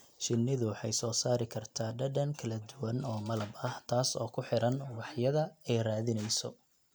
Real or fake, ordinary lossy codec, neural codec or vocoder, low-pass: real; none; none; none